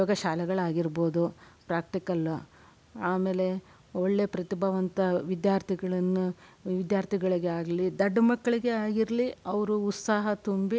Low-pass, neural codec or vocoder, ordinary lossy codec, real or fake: none; none; none; real